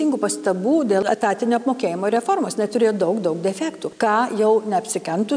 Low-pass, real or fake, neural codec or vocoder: 9.9 kHz; real; none